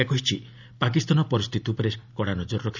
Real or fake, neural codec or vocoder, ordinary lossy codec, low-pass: real; none; none; 7.2 kHz